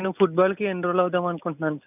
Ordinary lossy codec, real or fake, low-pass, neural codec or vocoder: none; real; 3.6 kHz; none